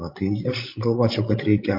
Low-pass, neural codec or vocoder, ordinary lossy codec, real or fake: 5.4 kHz; codec, 16 kHz, 16 kbps, FreqCodec, larger model; MP3, 32 kbps; fake